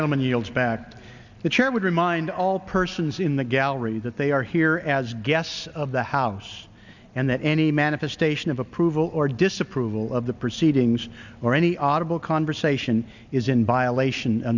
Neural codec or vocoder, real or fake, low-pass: none; real; 7.2 kHz